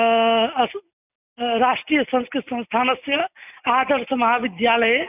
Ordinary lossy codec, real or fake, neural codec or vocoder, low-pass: none; real; none; 3.6 kHz